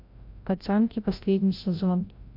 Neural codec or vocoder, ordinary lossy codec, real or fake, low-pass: codec, 16 kHz, 0.5 kbps, FreqCodec, larger model; MP3, 48 kbps; fake; 5.4 kHz